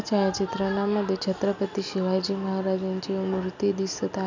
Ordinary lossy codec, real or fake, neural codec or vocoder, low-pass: none; real; none; 7.2 kHz